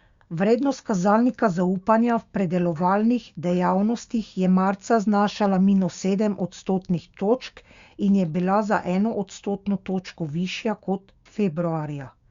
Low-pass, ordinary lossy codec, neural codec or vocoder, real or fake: 7.2 kHz; Opus, 64 kbps; codec, 16 kHz, 6 kbps, DAC; fake